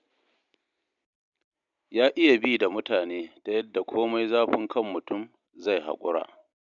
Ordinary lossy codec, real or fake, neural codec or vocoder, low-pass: none; real; none; 7.2 kHz